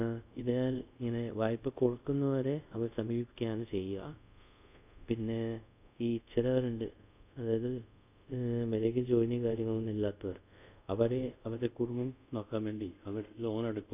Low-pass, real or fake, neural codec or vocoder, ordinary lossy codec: 3.6 kHz; fake; codec, 24 kHz, 0.5 kbps, DualCodec; none